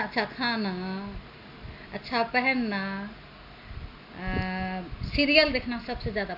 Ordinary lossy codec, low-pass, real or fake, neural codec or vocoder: AAC, 48 kbps; 5.4 kHz; real; none